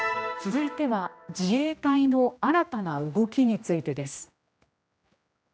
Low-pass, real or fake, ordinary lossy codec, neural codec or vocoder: none; fake; none; codec, 16 kHz, 1 kbps, X-Codec, HuBERT features, trained on general audio